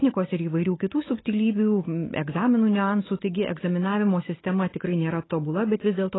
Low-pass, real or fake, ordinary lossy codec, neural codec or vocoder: 7.2 kHz; real; AAC, 16 kbps; none